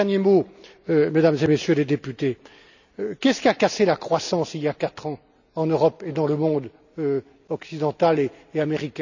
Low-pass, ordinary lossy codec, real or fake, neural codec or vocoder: 7.2 kHz; none; real; none